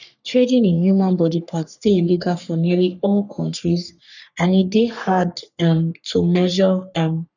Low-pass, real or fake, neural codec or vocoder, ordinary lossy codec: 7.2 kHz; fake; codec, 44.1 kHz, 3.4 kbps, Pupu-Codec; none